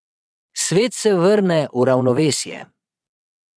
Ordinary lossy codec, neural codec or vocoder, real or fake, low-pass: none; vocoder, 22.05 kHz, 80 mel bands, Vocos; fake; none